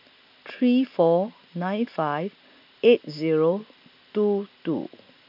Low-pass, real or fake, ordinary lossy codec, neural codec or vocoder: 5.4 kHz; real; none; none